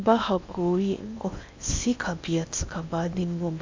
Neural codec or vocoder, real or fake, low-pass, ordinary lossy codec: codec, 16 kHz in and 24 kHz out, 0.8 kbps, FocalCodec, streaming, 65536 codes; fake; 7.2 kHz; MP3, 64 kbps